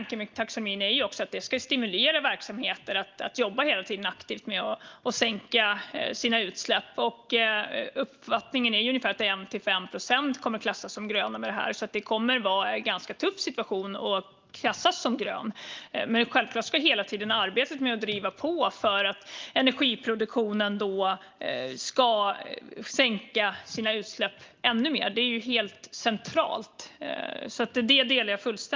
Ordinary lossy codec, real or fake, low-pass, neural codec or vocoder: Opus, 32 kbps; real; 7.2 kHz; none